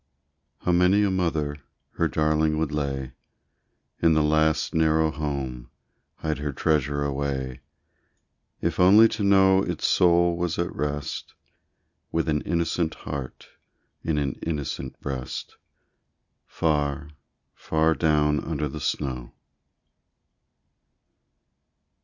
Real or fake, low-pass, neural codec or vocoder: real; 7.2 kHz; none